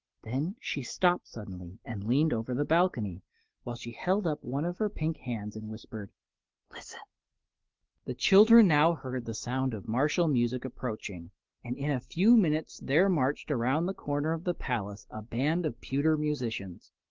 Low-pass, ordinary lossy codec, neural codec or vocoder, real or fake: 7.2 kHz; Opus, 16 kbps; none; real